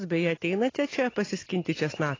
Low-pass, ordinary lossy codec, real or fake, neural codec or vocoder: 7.2 kHz; AAC, 32 kbps; real; none